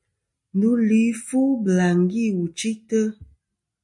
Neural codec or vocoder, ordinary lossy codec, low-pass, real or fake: none; MP3, 48 kbps; 10.8 kHz; real